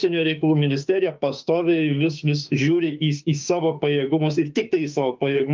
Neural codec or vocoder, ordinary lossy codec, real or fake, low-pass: autoencoder, 48 kHz, 32 numbers a frame, DAC-VAE, trained on Japanese speech; Opus, 24 kbps; fake; 7.2 kHz